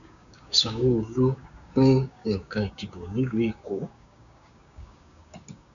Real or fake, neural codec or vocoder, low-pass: fake; codec, 16 kHz, 6 kbps, DAC; 7.2 kHz